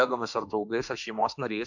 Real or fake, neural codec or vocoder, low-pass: fake; autoencoder, 48 kHz, 32 numbers a frame, DAC-VAE, trained on Japanese speech; 7.2 kHz